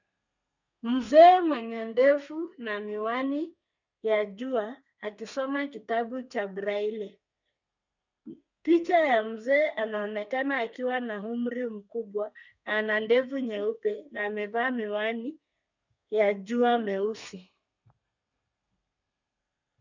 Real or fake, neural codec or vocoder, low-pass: fake; codec, 32 kHz, 1.9 kbps, SNAC; 7.2 kHz